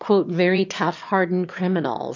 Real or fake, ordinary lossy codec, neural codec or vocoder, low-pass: fake; MP3, 48 kbps; autoencoder, 22.05 kHz, a latent of 192 numbers a frame, VITS, trained on one speaker; 7.2 kHz